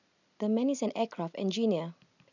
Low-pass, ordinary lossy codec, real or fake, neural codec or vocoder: 7.2 kHz; none; real; none